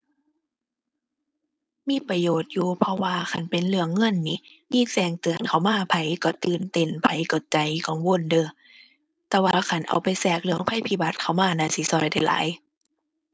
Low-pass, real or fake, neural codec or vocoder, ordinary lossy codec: none; fake; codec, 16 kHz, 4.8 kbps, FACodec; none